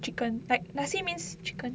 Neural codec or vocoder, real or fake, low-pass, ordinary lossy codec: none; real; none; none